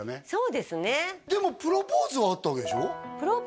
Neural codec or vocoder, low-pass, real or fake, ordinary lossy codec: none; none; real; none